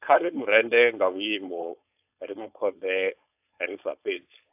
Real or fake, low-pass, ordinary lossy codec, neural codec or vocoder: fake; 3.6 kHz; AAC, 32 kbps; codec, 16 kHz, 4.8 kbps, FACodec